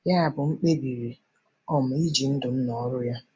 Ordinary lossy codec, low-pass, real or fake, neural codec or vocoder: none; none; real; none